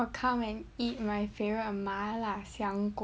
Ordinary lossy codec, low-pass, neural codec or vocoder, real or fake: none; none; none; real